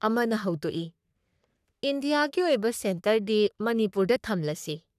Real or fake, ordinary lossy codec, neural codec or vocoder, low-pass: fake; none; codec, 44.1 kHz, 3.4 kbps, Pupu-Codec; 14.4 kHz